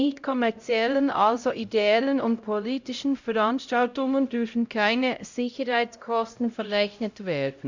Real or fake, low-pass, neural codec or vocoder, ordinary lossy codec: fake; 7.2 kHz; codec, 16 kHz, 0.5 kbps, X-Codec, HuBERT features, trained on LibriSpeech; Opus, 64 kbps